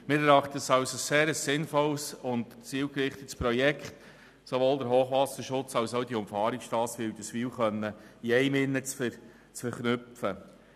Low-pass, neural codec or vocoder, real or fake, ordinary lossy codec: 14.4 kHz; none; real; none